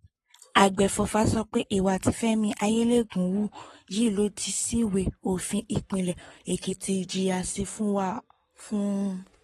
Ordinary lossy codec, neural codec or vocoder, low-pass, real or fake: AAC, 32 kbps; codec, 44.1 kHz, 7.8 kbps, Pupu-Codec; 19.8 kHz; fake